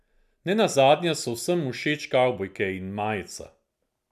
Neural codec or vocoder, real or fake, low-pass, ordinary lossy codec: none; real; 14.4 kHz; none